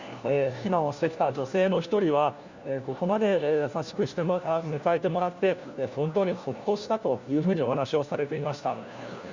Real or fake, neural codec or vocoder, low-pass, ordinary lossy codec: fake; codec, 16 kHz, 1 kbps, FunCodec, trained on LibriTTS, 50 frames a second; 7.2 kHz; Opus, 64 kbps